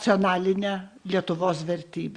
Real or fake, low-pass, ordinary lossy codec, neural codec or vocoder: real; 9.9 kHz; Opus, 64 kbps; none